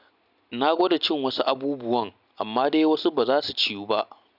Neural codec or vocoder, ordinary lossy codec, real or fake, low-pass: none; none; real; 5.4 kHz